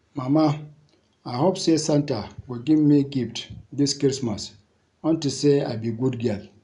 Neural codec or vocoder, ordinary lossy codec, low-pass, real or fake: none; none; 10.8 kHz; real